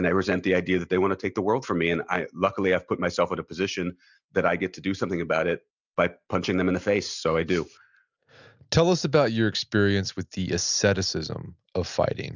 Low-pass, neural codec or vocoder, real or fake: 7.2 kHz; none; real